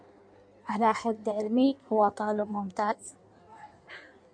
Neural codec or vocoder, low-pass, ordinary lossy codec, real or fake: codec, 16 kHz in and 24 kHz out, 1.1 kbps, FireRedTTS-2 codec; 9.9 kHz; MP3, 64 kbps; fake